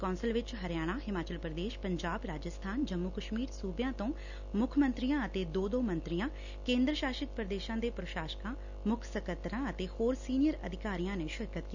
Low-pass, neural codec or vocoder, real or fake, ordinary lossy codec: 7.2 kHz; none; real; none